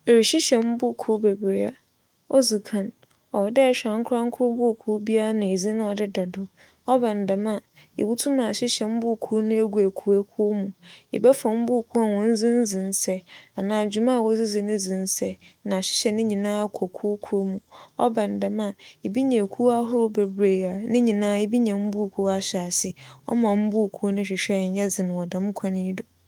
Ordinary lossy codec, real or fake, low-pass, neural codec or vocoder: Opus, 32 kbps; fake; 19.8 kHz; autoencoder, 48 kHz, 128 numbers a frame, DAC-VAE, trained on Japanese speech